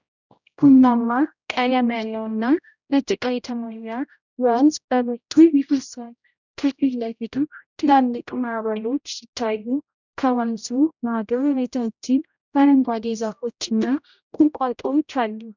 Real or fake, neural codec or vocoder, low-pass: fake; codec, 16 kHz, 0.5 kbps, X-Codec, HuBERT features, trained on general audio; 7.2 kHz